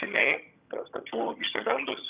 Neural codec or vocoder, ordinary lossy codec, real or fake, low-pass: vocoder, 22.05 kHz, 80 mel bands, HiFi-GAN; Opus, 64 kbps; fake; 3.6 kHz